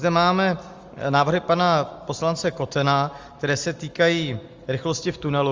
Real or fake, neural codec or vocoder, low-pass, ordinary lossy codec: real; none; 7.2 kHz; Opus, 32 kbps